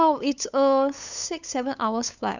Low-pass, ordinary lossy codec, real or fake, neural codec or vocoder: 7.2 kHz; none; fake; codec, 16 kHz, 8 kbps, FunCodec, trained on LibriTTS, 25 frames a second